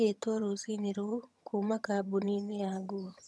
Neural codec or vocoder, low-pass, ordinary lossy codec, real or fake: vocoder, 22.05 kHz, 80 mel bands, HiFi-GAN; none; none; fake